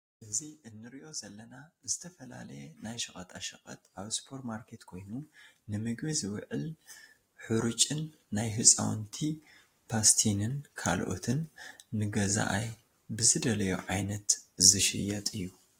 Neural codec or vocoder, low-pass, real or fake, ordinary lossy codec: none; 19.8 kHz; real; AAC, 48 kbps